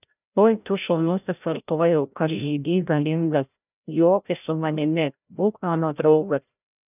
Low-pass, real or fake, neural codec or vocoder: 3.6 kHz; fake; codec, 16 kHz, 0.5 kbps, FreqCodec, larger model